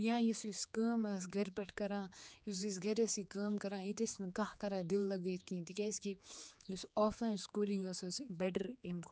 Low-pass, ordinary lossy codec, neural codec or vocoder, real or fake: none; none; codec, 16 kHz, 4 kbps, X-Codec, HuBERT features, trained on general audio; fake